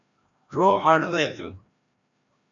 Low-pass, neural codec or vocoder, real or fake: 7.2 kHz; codec, 16 kHz, 1 kbps, FreqCodec, larger model; fake